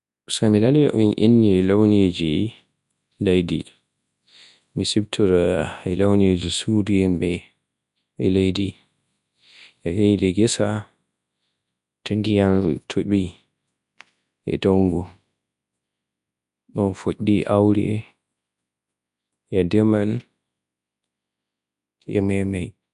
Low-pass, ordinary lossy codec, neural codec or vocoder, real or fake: 10.8 kHz; none; codec, 24 kHz, 0.9 kbps, WavTokenizer, large speech release; fake